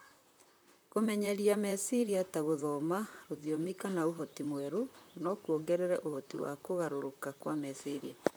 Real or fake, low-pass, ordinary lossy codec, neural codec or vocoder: fake; none; none; vocoder, 44.1 kHz, 128 mel bands, Pupu-Vocoder